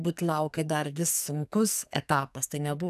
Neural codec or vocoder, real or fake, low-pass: codec, 44.1 kHz, 2.6 kbps, SNAC; fake; 14.4 kHz